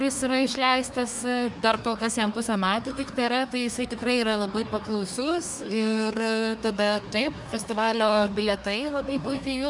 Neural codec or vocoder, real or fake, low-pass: codec, 24 kHz, 1 kbps, SNAC; fake; 10.8 kHz